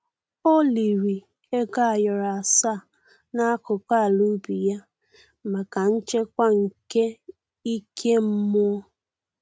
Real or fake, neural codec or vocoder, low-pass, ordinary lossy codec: real; none; none; none